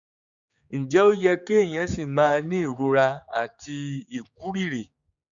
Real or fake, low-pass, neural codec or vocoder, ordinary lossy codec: fake; 7.2 kHz; codec, 16 kHz, 4 kbps, X-Codec, HuBERT features, trained on general audio; Opus, 64 kbps